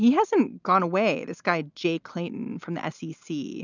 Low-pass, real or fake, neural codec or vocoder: 7.2 kHz; real; none